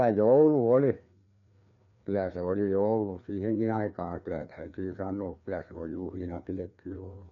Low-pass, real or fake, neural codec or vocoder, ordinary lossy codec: 7.2 kHz; fake; codec, 16 kHz, 2 kbps, FreqCodec, larger model; none